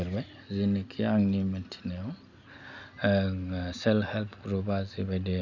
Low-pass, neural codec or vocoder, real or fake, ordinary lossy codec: 7.2 kHz; none; real; none